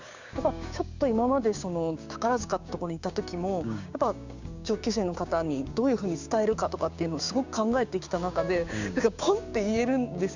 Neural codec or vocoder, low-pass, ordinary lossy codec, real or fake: codec, 16 kHz, 6 kbps, DAC; 7.2 kHz; Opus, 64 kbps; fake